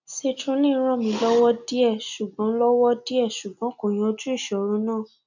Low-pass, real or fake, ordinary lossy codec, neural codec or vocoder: 7.2 kHz; real; none; none